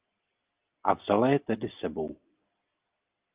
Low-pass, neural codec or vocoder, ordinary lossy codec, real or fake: 3.6 kHz; none; Opus, 24 kbps; real